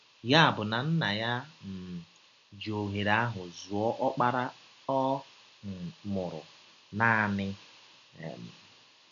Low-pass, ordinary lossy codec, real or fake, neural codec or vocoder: 7.2 kHz; none; real; none